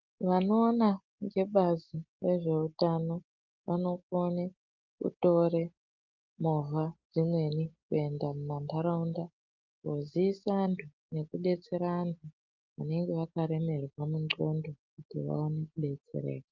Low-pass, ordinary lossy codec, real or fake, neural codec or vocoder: 7.2 kHz; Opus, 32 kbps; real; none